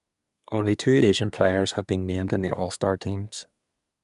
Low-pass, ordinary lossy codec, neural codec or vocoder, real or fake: 10.8 kHz; none; codec, 24 kHz, 1 kbps, SNAC; fake